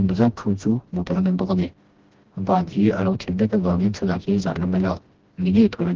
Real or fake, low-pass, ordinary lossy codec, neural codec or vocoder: fake; 7.2 kHz; Opus, 16 kbps; codec, 16 kHz, 1 kbps, FreqCodec, smaller model